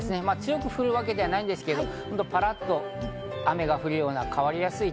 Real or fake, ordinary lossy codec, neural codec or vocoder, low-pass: real; none; none; none